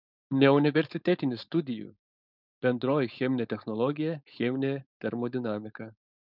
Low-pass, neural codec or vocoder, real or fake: 5.4 kHz; codec, 16 kHz, 4.8 kbps, FACodec; fake